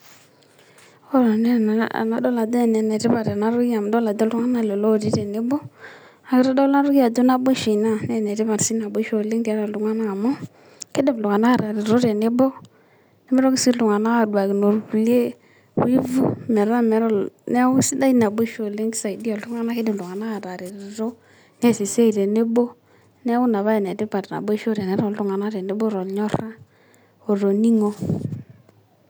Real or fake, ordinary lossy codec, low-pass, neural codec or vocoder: real; none; none; none